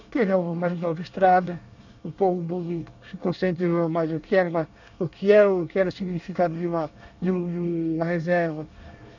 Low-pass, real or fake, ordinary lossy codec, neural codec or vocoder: 7.2 kHz; fake; none; codec, 24 kHz, 1 kbps, SNAC